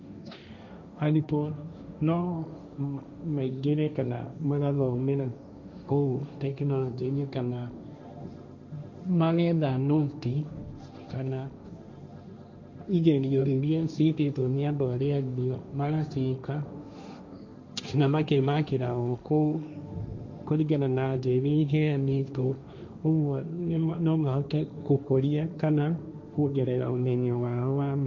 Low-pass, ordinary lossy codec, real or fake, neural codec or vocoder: 7.2 kHz; none; fake; codec, 16 kHz, 1.1 kbps, Voila-Tokenizer